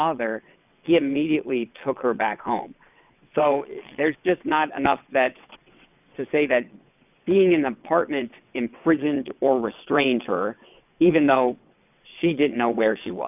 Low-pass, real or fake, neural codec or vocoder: 3.6 kHz; fake; vocoder, 22.05 kHz, 80 mel bands, WaveNeXt